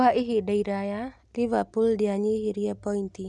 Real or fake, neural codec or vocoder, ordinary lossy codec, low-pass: real; none; none; none